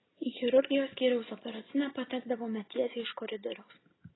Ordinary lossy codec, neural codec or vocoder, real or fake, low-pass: AAC, 16 kbps; none; real; 7.2 kHz